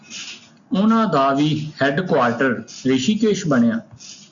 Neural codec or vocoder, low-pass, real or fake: none; 7.2 kHz; real